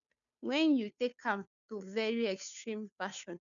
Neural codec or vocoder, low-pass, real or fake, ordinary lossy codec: codec, 16 kHz, 2 kbps, FunCodec, trained on Chinese and English, 25 frames a second; 7.2 kHz; fake; none